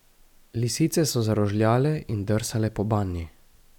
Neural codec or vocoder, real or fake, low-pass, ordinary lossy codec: none; real; 19.8 kHz; none